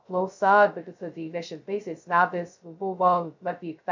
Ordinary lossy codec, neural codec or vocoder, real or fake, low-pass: MP3, 64 kbps; codec, 16 kHz, 0.2 kbps, FocalCodec; fake; 7.2 kHz